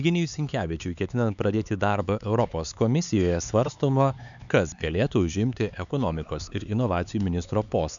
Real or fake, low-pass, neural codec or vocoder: fake; 7.2 kHz; codec, 16 kHz, 4 kbps, X-Codec, HuBERT features, trained on LibriSpeech